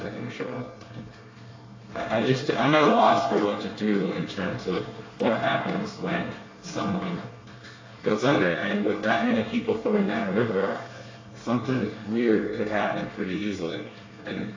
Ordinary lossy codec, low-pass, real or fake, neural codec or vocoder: AAC, 32 kbps; 7.2 kHz; fake; codec, 24 kHz, 1 kbps, SNAC